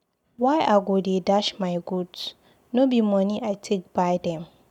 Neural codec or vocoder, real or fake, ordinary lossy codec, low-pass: none; real; none; 19.8 kHz